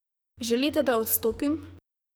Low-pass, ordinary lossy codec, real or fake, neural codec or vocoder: none; none; fake; codec, 44.1 kHz, 2.6 kbps, SNAC